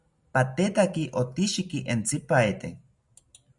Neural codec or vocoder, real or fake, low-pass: none; real; 10.8 kHz